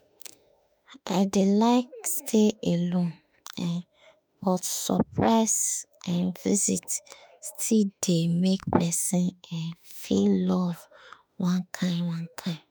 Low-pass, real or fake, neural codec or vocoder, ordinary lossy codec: none; fake; autoencoder, 48 kHz, 32 numbers a frame, DAC-VAE, trained on Japanese speech; none